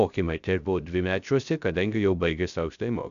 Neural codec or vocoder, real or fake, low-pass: codec, 16 kHz, 0.3 kbps, FocalCodec; fake; 7.2 kHz